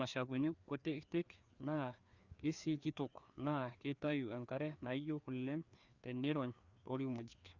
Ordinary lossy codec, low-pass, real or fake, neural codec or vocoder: Opus, 64 kbps; 7.2 kHz; fake; codec, 16 kHz, 2 kbps, FreqCodec, larger model